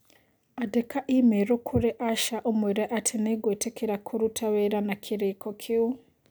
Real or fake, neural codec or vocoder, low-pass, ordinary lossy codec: real; none; none; none